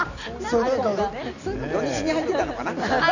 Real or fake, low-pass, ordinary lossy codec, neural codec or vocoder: real; 7.2 kHz; none; none